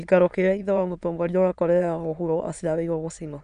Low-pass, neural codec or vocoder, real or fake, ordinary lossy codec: 9.9 kHz; autoencoder, 22.05 kHz, a latent of 192 numbers a frame, VITS, trained on many speakers; fake; none